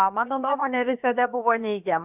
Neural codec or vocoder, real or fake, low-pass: codec, 16 kHz, about 1 kbps, DyCAST, with the encoder's durations; fake; 3.6 kHz